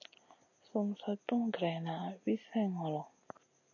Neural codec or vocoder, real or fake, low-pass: none; real; 7.2 kHz